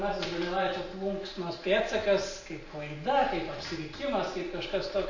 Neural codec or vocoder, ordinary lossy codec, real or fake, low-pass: none; MP3, 32 kbps; real; 7.2 kHz